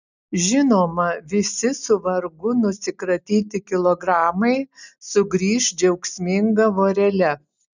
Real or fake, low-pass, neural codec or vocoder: real; 7.2 kHz; none